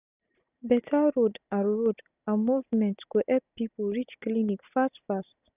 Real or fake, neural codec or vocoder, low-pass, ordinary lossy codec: real; none; 3.6 kHz; none